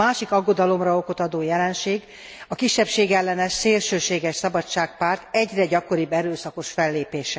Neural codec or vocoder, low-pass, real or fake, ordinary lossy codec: none; none; real; none